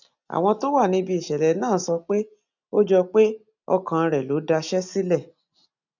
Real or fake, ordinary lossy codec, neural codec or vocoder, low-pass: real; none; none; 7.2 kHz